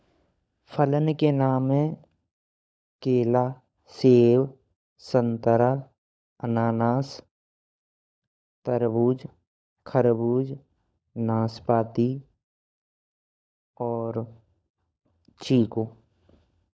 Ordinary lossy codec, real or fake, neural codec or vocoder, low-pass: none; fake; codec, 16 kHz, 16 kbps, FunCodec, trained on LibriTTS, 50 frames a second; none